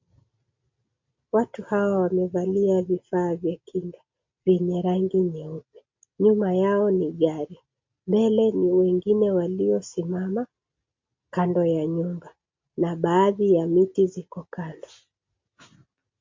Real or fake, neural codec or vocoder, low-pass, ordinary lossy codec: real; none; 7.2 kHz; MP3, 48 kbps